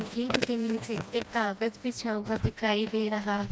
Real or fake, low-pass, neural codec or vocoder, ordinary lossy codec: fake; none; codec, 16 kHz, 1 kbps, FreqCodec, smaller model; none